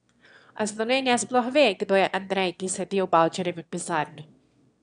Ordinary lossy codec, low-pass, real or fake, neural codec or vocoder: none; 9.9 kHz; fake; autoencoder, 22.05 kHz, a latent of 192 numbers a frame, VITS, trained on one speaker